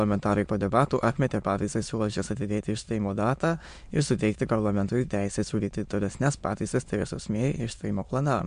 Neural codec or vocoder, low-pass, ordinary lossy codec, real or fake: autoencoder, 22.05 kHz, a latent of 192 numbers a frame, VITS, trained on many speakers; 9.9 kHz; MP3, 64 kbps; fake